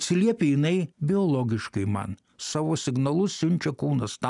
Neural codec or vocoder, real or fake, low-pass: none; real; 10.8 kHz